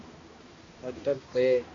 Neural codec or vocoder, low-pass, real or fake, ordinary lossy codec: codec, 16 kHz, 1 kbps, X-Codec, HuBERT features, trained on balanced general audio; 7.2 kHz; fake; AAC, 32 kbps